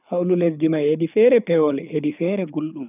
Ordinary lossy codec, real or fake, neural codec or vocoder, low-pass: none; fake; codec, 24 kHz, 6 kbps, HILCodec; 3.6 kHz